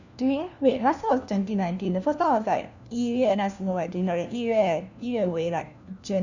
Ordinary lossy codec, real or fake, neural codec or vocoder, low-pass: none; fake; codec, 16 kHz, 1 kbps, FunCodec, trained on LibriTTS, 50 frames a second; 7.2 kHz